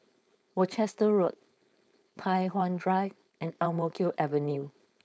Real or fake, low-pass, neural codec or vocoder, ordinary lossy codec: fake; none; codec, 16 kHz, 4.8 kbps, FACodec; none